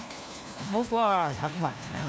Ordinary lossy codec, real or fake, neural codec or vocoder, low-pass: none; fake; codec, 16 kHz, 1 kbps, FunCodec, trained on LibriTTS, 50 frames a second; none